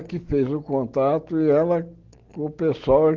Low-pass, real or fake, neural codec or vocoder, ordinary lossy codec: 7.2 kHz; real; none; Opus, 16 kbps